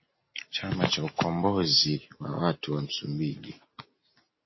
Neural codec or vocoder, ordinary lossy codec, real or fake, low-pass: none; MP3, 24 kbps; real; 7.2 kHz